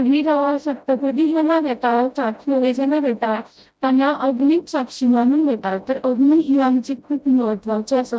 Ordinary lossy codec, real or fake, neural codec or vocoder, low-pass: none; fake; codec, 16 kHz, 0.5 kbps, FreqCodec, smaller model; none